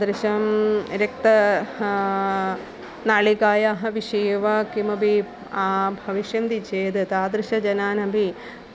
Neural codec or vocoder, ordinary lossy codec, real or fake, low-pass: none; none; real; none